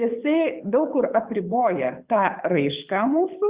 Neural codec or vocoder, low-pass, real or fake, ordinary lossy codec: codec, 24 kHz, 6 kbps, HILCodec; 3.6 kHz; fake; Opus, 64 kbps